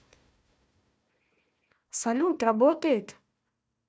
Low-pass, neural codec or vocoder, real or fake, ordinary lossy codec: none; codec, 16 kHz, 1 kbps, FunCodec, trained on Chinese and English, 50 frames a second; fake; none